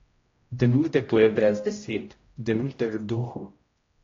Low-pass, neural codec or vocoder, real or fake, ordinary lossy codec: 7.2 kHz; codec, 16 kHz, 0.5 kbps, X-Codec, HuBERT features, trained on general audio; fake; AAC, 32 kbps